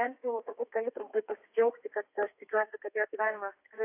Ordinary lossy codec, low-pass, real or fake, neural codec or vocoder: AAC, 24 kbps; 3.6 kHz; fake; codec, 32 kHz, 1.9 kbps, SNAC